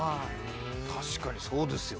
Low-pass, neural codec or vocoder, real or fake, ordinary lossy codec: none; none; real; none